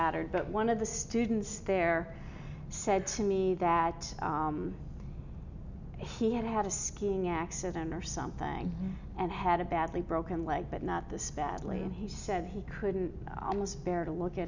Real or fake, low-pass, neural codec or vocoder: real; 7.2 kHz; none